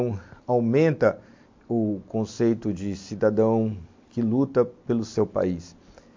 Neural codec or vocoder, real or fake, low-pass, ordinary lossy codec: none; real; 7.2 kHz; MP3, 48 kbps